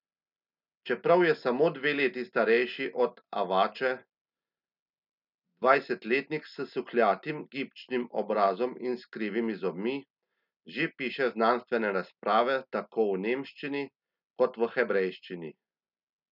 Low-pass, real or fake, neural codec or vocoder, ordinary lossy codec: 5.4 kHz; real; none; none